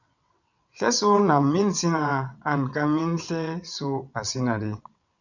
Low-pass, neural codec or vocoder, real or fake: 7.2 kHz; vocoder, 22.05 kHz, 80 mel bands, WaveNeXt; fake